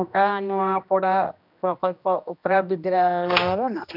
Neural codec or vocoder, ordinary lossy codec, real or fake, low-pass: codec, 16 kHz, 1 kbps, X-Codec, HuBERT features, trained on general audio; none; fake; 5.4 kHz